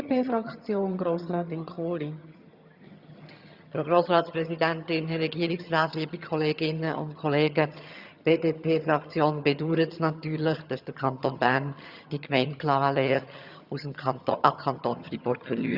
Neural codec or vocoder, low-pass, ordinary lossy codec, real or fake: vocoder, 22.05 kHz, 80 mel bands, HiFi-GAN; 5.4 kHz; Opus, 64 kbps; fake